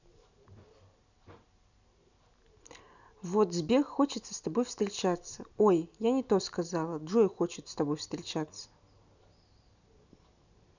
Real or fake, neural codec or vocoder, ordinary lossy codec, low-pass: real; none; none; 7.2 kHz